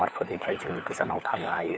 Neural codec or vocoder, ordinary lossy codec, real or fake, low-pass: codec, 16 kHz, 4 kbps, FunCodec, trained on LibriTTS, 50 frames a second; none; fake; none